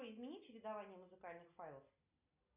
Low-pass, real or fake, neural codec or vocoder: 3.6 kHz; real; none